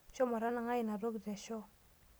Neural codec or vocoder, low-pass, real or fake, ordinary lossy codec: none; none; real; none